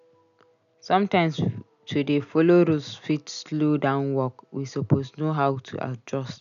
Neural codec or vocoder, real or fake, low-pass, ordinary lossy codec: none; real; 7.2 kHz; none